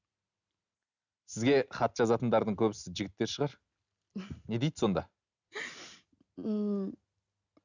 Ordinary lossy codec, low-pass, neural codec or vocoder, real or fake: none; 7.2 kHz; none; real